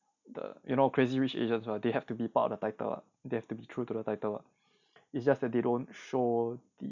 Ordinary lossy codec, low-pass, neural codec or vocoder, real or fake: none; 7.2 kHz; none; real